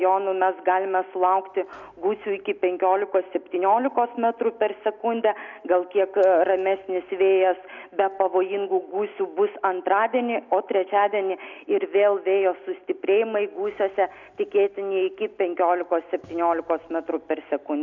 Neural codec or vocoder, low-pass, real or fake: none; 7.2 kHz; real